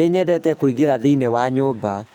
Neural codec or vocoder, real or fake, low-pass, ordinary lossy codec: codec, 44.1 kHz, 2.6 kbps, SNAC; fake; none; none